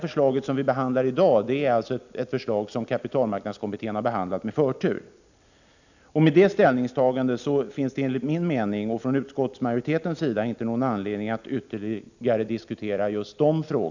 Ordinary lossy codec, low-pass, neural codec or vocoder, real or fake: none; 7.2 kHz; none; real